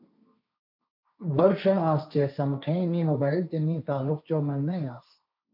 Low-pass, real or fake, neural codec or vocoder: 5.4 kHz; fake; codec, 16 kHz, 1.1 kbps, Voila-Tokenizer